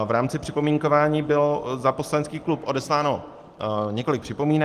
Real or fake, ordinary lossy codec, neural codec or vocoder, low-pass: real; Opus, 24 kbps; none; 14.4 kHz